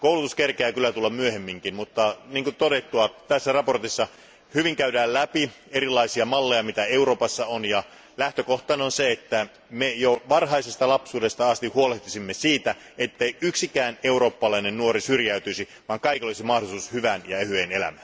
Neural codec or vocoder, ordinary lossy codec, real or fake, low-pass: none; none; real; none